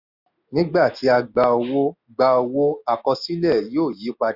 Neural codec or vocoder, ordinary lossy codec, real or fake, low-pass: none; none; real; 5.4 kHz